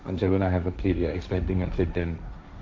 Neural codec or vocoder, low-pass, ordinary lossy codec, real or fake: codec, 16 kHz, 1.1 kbps, Voila-Tokenizer; none; none; fake